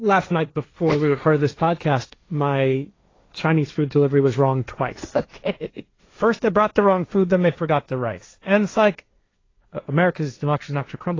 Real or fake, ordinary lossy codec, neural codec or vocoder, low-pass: fake; AAC, 32 kbps; codec, 16 kHz, 1.1 kbps, Voila-Tokenizer; 7.2 kHz